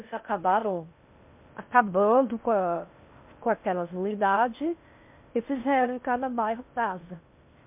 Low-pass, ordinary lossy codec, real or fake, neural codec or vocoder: 3.6 kHz; MP3, 32 kbps; fake; codec, 16 kHz in and 24 kHz out, 0.6 kbps, FocalCodec, streaming, 4096 codes